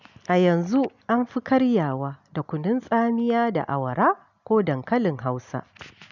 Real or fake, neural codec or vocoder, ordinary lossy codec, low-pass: real; none; none; 7.2 kHz